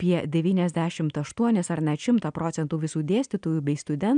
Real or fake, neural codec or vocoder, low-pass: real; none; 9.9 kHz